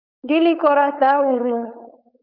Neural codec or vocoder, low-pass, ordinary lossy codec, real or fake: codec, 16 kHz, 4.8 kbps, FACodec; 5.4 kHz; Opus, 64 kbps; fake